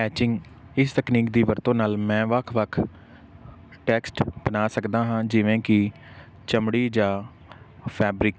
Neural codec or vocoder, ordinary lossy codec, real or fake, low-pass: none; none; real; none